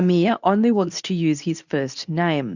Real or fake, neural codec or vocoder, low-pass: fake; codec, 24 kHz, 0.9 kbps, WavTokenizer, medium speech release version 2; 7.2 kHz